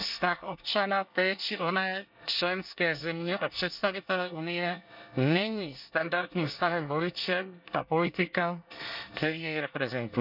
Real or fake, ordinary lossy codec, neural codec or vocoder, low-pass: fake; none; codec, 24 kHz, 1 kbps, SNAC; 5.4 kHz